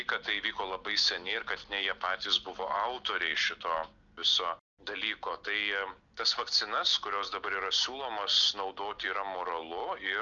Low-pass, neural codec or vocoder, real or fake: 7.2 kHz; none; real